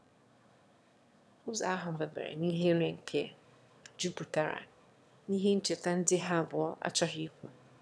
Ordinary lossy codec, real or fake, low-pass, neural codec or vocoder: none; fake; none; autoencoder, 22.05 kHz, a latent of 192 numbers a frame, VITS, trained on one speaker